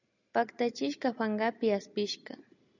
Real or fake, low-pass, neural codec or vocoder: real; 7.2 kHz; none